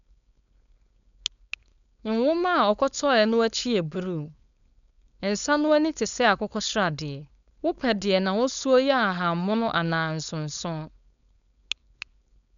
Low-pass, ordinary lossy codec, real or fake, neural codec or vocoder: 7.2 kHz; none; fake; codec, 16 kHz, 4.8 kbps, FACodec